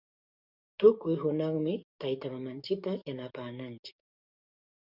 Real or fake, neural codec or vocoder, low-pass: fake; codec, 44.1 kHz, 7.8 kbps, DAC; 5.4 kHz